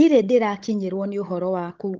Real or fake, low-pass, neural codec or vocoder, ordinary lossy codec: fake; 7.2 kHz; codec, 16 kHz, 16 kbps, FunCodec, trained on Chinese and English, 50 frames a second; Opus, 16 kbps